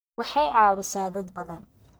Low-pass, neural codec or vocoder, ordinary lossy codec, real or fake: none; codec, 44.1 kHz, 1.7 kbps, Pupu-Codec; none; fake